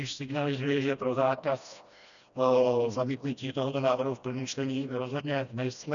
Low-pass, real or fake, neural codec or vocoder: 7.2 kHz; fake; codec, 16 kHz, 1 kbps, FreqCodec, smaller model